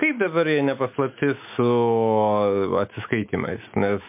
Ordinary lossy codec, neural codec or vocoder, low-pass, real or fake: MP3, 32 kbps; autoencoder, 48 kHz, 128 numbers a frame, DAC-VAE, trained on Japanese speech; 3.6 kHz; fake